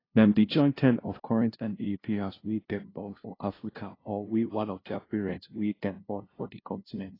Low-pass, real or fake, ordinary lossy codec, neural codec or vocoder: 5.4 kHz; fake; AAC, 24 kbps; codec, 16 kHz, 0.5 kbps, FunCodec, trained on LibriTTS, 25 frames a second